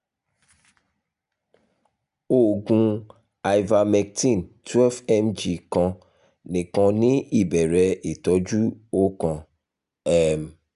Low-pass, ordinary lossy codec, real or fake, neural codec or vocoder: 10.8 kHz; none; real; none